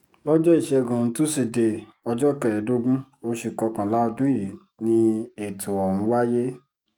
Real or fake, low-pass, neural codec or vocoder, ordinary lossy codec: fake; 19.8 kHz; codec, 44.1 kHz, 7.8 kbps, DAC; none